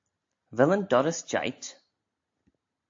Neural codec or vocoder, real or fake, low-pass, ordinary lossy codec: none; real; 7.2 kHz; AAC, 48 kbps